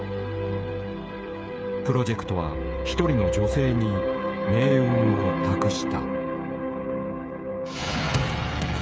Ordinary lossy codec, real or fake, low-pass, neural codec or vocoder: none; fake; none; codec, 16 kHz, 16 kbps, FreqCodec, smaller model